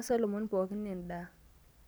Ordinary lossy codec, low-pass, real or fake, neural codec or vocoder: none; none; fake; vocoder, 44.1 kHz, 128 mel bands every 256 samples, BigVGAN v2